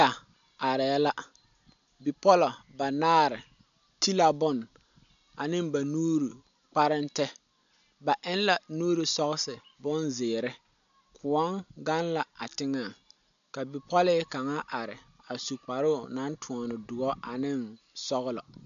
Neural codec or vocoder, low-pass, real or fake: none; 7.2 kHz; real